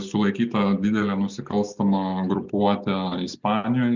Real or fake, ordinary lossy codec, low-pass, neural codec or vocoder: real; Opus, 64 kbps; 7.2 kHz; none